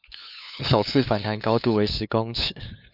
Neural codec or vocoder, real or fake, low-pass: codec, 16 kHz, 2 kbps, FunCodec, trained on LibriTTS, 25 frames a second; fake; 5.4 kHz